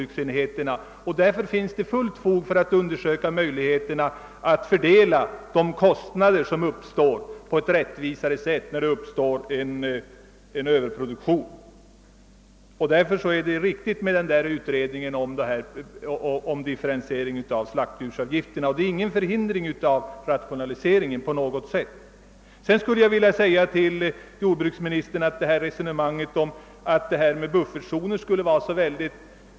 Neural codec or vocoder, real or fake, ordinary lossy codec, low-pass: none; real; none; none